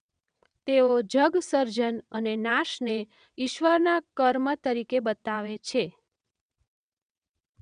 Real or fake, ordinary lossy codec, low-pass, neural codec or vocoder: fake; none; 9.9 kHz; vocoder, 22.05 kHz, 80 mel bands, WaveNeXt